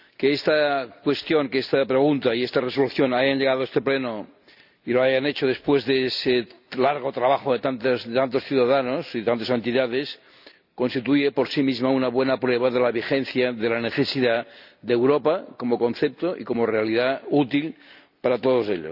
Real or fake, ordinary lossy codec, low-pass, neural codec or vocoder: real; none; 5.4 kHz; none